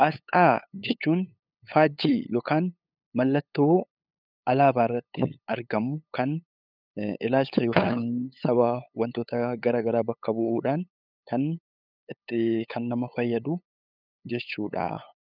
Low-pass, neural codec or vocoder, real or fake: 5.4 kHz; codec, 16 kHz, 8 kbps, FunCodec, trained on LibriTTS, 25 frames a second; fake